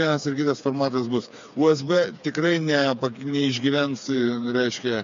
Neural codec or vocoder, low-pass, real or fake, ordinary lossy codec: codec, 16 kHz, 4 kbps, FreqCodec, smaller model; 7.2 kHz; fake; MP3, 48 kbps